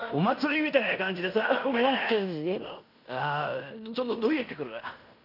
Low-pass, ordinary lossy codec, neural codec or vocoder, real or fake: 5.4 kHz; none; codec, 16 kHz in and 24 kHz out, 0.9 kbps, LongCat-Audio-Codec, fine tuned four codebook decoder; fake